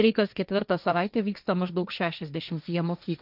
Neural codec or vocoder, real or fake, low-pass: codec, 16 kHz, 1.1 kbps, Voila-Tokenizer; fake; 5.4 kHz